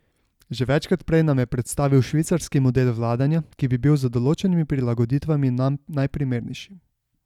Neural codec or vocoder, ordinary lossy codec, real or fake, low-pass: none; none; real; 19.8 kHz